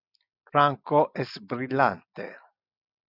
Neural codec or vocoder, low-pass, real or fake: none; 5.4 kHz; real